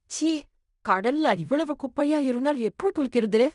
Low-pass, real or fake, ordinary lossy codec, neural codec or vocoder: 10.8 kHz; fake; none; codec, 16 kHz in and 24 kHz out, 0.4 kbps, LongCat-Audio-Codec, fine tuned four codebook decoder